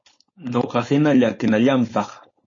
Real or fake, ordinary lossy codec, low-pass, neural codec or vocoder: fake; MP3, 32 kbps; 7.2 kHz; codec, 16 kHz, 4.8 kbps, FACodec